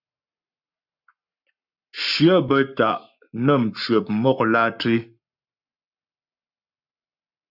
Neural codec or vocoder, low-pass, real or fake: codec, 44.1 kHz, 7.8 kbps, Pupu-Codec; 5.4 kHz; fake